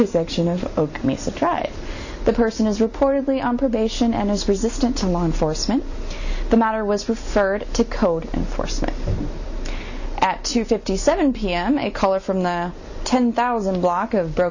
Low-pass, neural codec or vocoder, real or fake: 7.2 kHz; none; real